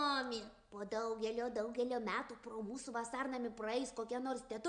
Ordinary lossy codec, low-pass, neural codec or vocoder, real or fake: Opus, 64 kbps; 9.9 kHz; none; real